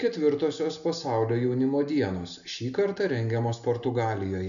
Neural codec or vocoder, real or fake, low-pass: none; real; 7.2 kHz